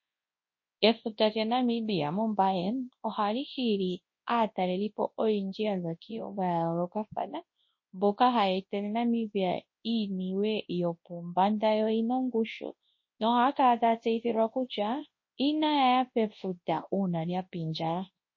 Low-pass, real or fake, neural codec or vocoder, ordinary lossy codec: 7.2 kHz; fake; codec, 24 kHz, 0.9 kbps, WavTokenizer, large speech release; MP3, 32 kbps